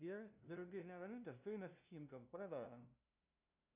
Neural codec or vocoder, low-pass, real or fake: codec, 16 kHz, 0.5 kbps, FunCodec, trained on LibriTTS, 25 frames a second; 3.6 kHz; fake